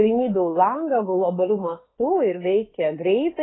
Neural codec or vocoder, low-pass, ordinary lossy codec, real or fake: autoencoder, 48 kHz, 32 numbers a frame, DAC-VAE, trained on Japanese speech; 7.2 kHz; AAC, 16 kbps; fake